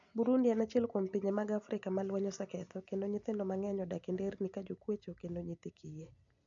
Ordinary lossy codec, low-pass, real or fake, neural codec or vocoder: none; 7.2 kHz; real; none